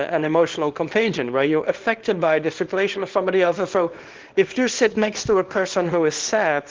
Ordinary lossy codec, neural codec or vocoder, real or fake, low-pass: Opus, 16 kbps; codec, 24 kHz, 0.9 kbps, WavTokenizer, small release; fake; 7.2 kHz